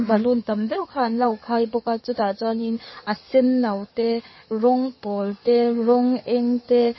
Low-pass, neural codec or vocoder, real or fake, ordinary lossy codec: 7.2 kHz; codec, 16 kHz in and 24 kHz out, 2.2 kbps, FireRedTTS-2 codec; fake; MP3, 24 kbps